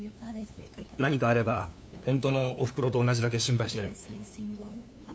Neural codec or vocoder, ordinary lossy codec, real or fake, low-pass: codec, 16 kHz, 2 kbps, FunCodec, trained on LibriTTS, 25 frames a second; none; fake; none